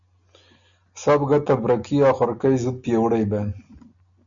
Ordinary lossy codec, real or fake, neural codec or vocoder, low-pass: MP3, 96 kbps; real; none; 7.2 kHz